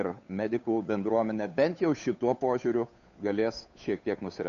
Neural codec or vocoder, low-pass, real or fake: codec, 16 kHz, 8 kbps, FunCodec, trained on Chinese and English, 25 frames a second; 7.2 kHz; fake